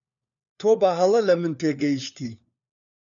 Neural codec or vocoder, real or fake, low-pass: codec, 16 kHz, 4 kbps, FunCodec, trained on LibriTTS, 50 frames a second; fake; 7.2 kHz